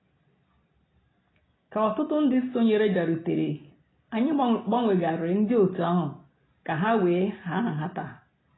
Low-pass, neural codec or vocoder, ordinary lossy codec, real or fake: 7.2 kHz; none; AAC, 16 kbps; real